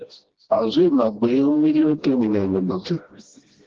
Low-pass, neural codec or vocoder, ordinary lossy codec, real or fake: 7.2 kHz; codec, 16 kHz, 1 kbps, FreqCodec, smaller model; Opus, 16 kbps; fake